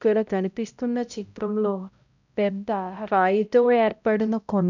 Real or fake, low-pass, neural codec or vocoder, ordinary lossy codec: fake; 7.2 kHz; codec, 16 kHz, 0.5 kbps, X-Codec, HuBERT features, trained on balanced general audio; none